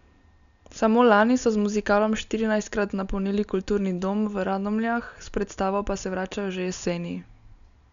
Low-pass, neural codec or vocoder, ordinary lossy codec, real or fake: 7.2 kHz; none; none; real